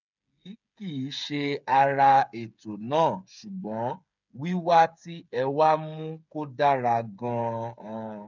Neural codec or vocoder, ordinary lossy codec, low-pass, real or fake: codec, 16 kHz, 8 kbps, FreqCodec, smaller model; none; 7.2 kHz; fake